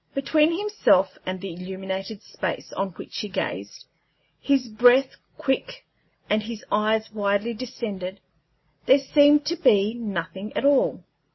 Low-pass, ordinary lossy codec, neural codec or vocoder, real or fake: 7.2 kHz; MP3, 24 kbps; none; real